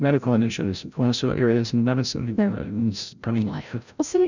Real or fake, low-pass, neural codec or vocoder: fake; 7.2 kHz; codec, 16 kHz, 0.5 kbps, FreqCodec, larger model